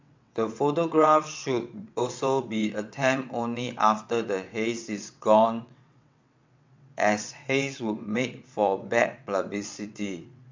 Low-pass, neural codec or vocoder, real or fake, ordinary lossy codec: 7.2 kHz; vocoder, 22.05 kHz, 80 mel bands, WaveNeXt; fake; MP3, 64 kbps